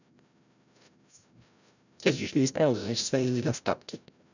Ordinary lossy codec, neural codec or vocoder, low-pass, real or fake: none; codec, 16 kHz, 0.5 kbps, FreqCodec, larger model; 7.2 kHz; fake